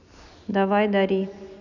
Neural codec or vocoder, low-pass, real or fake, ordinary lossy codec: none; 7.2 kHz; real; none